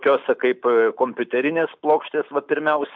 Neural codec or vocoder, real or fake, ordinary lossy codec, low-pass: none; real; MP3, 64 kbps; 7.2 kHz